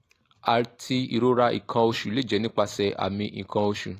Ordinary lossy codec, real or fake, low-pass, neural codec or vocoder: AAC, 48 kbps; real; 14.4 kHz; none